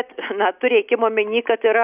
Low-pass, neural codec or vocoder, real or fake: 3.6 kHz; none; real